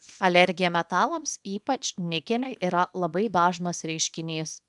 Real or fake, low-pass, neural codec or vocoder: fake; 10.8 kHz; codec, 24 kHz, 0.9 kbps, WavTokenizer, small release